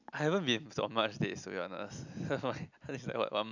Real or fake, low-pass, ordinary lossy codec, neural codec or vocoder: real; 7.2 kHz; none; none